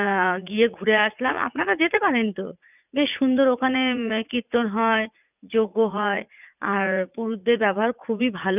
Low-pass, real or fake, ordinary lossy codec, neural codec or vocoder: 3.6 kHz; fake; none; vocoder, 44.1 kHz, 80 mel bands, Vocos